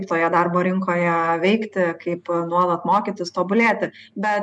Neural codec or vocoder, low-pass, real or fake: none; 10.8 kHz; real